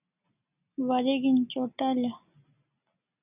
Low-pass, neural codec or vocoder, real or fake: 3.6 kHz; none; real